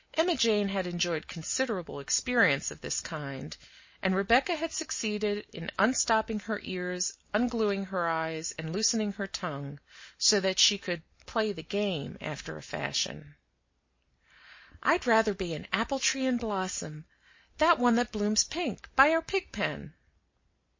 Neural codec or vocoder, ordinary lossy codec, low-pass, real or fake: none; MP3, 32 kbps; 7.2 kHz; real